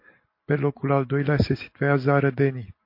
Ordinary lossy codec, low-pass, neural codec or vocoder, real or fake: MP3, 32 kbps; 5.4 kHz; none; real